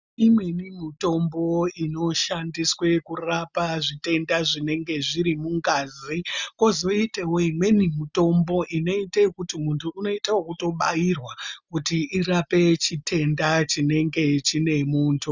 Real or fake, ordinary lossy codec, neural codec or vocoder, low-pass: real; Opus, 64 kbps; none; 7.2 kHz